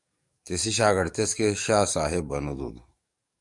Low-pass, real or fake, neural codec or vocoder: 10.8 kHz; fake; codec, 44.1 kHz, 7.8 kbps, DAC